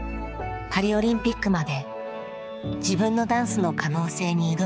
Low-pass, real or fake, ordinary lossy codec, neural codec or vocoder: none; fake; none; codec, 16 kHz, 4 kbps, X-Codec, HuBERT features, trained on balanced general audio